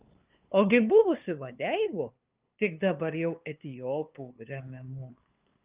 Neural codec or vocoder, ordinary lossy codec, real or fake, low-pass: codec, 16 kHz, 2 kbps, FunCodec, trained on LibriTTS, 25 frames a second; Opus, 24 kbps; fake; 3.6 kHz